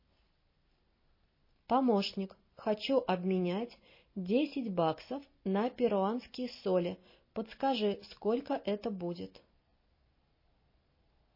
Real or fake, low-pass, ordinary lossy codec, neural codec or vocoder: real; 5.4 kHz; MP3, 24 kbps; none